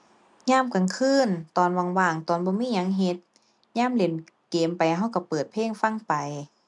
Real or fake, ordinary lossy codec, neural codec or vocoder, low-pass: real; none; none; 10.8 kHz